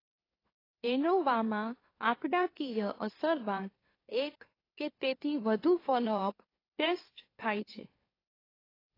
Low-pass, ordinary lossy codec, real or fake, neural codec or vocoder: 5.4 kHz; AAC, 24 kbps; fake; autoencoder, 44.1 kHz, a latent of 192 numbers a frame, MeloTTS